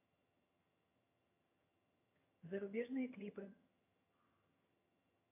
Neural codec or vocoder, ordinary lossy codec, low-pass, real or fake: vocoder, 22.05 kHz, 80 mel bands, HiFi-GAN; AAC, 24 kbps; 3.6 kHz; fake